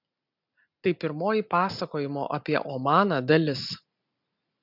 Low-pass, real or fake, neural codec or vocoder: 5.4 kHz; real; none